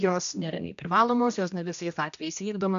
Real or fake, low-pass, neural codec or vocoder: fake; 7.2 kHz; codec, 16 kHz, 1 kbps, X-Codec, HuBERT features, trained on general audio